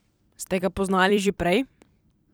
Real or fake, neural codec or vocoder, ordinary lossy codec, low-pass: fake; vocoder, 44.1 kHz, 128 mel bands every 256 samples, BigVGAN v2; none; none